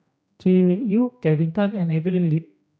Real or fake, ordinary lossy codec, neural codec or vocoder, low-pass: fake; none; codec, 16 kHz, 1 kbps, X-Codec, HuBERT features, trained on general audio; none